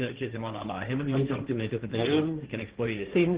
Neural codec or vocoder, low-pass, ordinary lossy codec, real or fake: codec, 16 kHz, 1.1 kbps, Voila-Tokenizer; 3.6 kHz; Opus, 16 kbps; fake